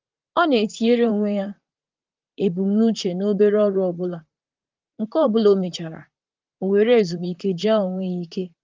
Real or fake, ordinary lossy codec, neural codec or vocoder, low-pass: fake; Opus, 24 kbps; vocoder, 44.1 kHz, 128 mel bands, Pupu-Vocoder; 7.2 kHz